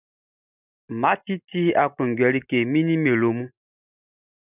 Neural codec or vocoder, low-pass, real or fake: none; 3.6 kHz; real